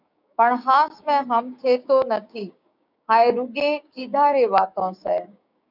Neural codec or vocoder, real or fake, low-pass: codec, 16 kHz, 6 kbps, DAC; fake; 5.4 kHz